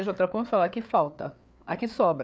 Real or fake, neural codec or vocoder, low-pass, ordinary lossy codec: fake; codec, 16 kHz, 4 kbps, FreqCodec, larger model; none; none